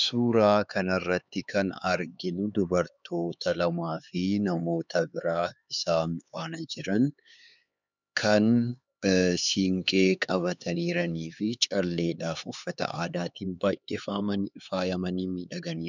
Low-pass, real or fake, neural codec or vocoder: 7.2 kHz; fake; codec, 16 kHz, 4 kbps, X-Codec, HuBERT features, trained on LibriSpeech